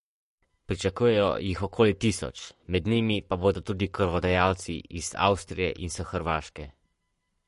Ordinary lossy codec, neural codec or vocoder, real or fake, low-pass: MP3, 48 kbps; codec, 44.1 kHz, 7.8 kbps, Pupu-Codec; fake; 14.4 kHz